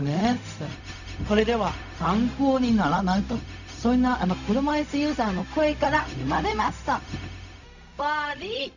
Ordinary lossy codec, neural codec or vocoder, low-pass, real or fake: none; codec, 16 kHz, 0.4 kbps, LongCat-Audio-Codec; 7.2 kHz; fake